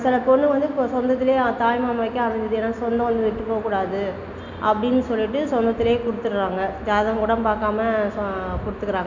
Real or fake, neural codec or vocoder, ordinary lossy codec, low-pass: real; none; none; 7.2 kHz